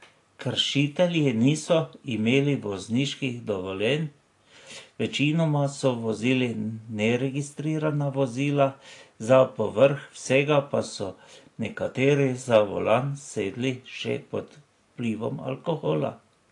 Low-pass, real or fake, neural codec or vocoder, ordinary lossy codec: 10.8 kHz; real; none; AAC, 48 kbps